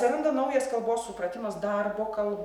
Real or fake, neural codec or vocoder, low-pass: real; none; 19.8 kHz